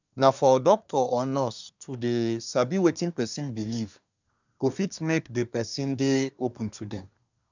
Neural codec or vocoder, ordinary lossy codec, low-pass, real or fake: codec, 24 kHz, 1 kbps, SNAC; none; 7.2 kHz; fake